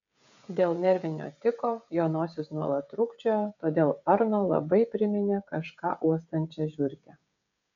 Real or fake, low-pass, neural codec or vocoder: fake; 7.2 kHz; codec, 16 kHz, 16 kbps, FreqCodec, smaller model